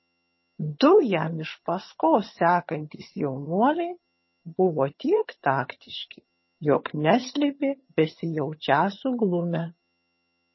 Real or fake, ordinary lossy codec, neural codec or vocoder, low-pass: fake; MP3, 24 kbps; vocoder, 22.05 kHz, 80 mel bands, HiFi-GAN; 7.2 kHz